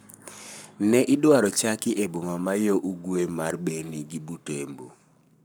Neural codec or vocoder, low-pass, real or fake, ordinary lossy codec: codec, 44.1 kHz, 7.8 kbps, Pupu-Codec; none; fake; none